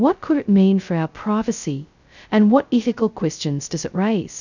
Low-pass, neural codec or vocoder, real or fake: 7.2 kHz; codec, 16 kHz, 0.2 kbps, FocalCodec; fake